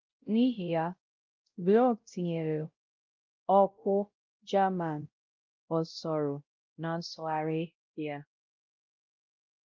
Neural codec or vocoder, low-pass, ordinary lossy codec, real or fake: codec, 16 kHz, 0.5 kbps, X-Codec, WavLM features, trained on Multilingual LibriSpeech; 7.2 kHz; Opus, 24 kbps; fake